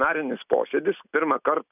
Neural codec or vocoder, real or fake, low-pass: none; real; 3.6 kHz